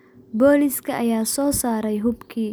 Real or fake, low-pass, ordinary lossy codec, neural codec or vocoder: real; none; none; none